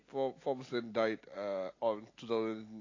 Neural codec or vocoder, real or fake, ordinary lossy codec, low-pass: none; real; AAC, 32 kbps; 7.2 kHz